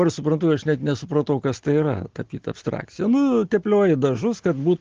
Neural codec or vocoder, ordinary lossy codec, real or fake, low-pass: none; Opus, 16 kbps; real; 7.2 kHz